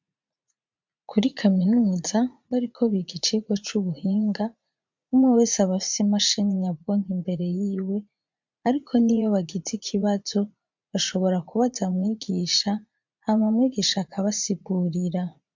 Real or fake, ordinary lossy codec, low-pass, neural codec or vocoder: fake; MP3, 64 kbps; 7.2 kHz; vocoder, 44.1 kHz, 80 mel bands, Vocos